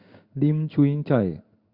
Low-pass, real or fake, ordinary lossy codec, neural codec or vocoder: 5.4 kHz; fake; Opus, 64 kbps; codec, 16 kHz in and 24 kHz out, 1 kbps, XY-Tokenizer